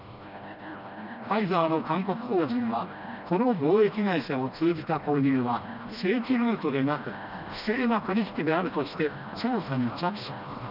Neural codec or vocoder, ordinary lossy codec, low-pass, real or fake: codec, 16 kHz, 1 kbps, FreqCodec, smaller model; none; 5.4 kHz; fake